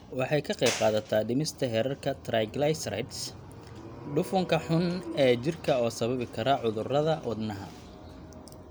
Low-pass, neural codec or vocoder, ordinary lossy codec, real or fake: none; none; none; real